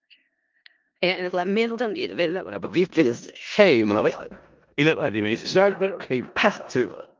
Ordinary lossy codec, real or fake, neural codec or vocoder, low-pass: Opus, 24 kbps; fake; codec, 16 kHz in and 24 kHz out, 0.4 kbps, LongCat-Audio-Codec, four codebook decoder; 7.2 kHz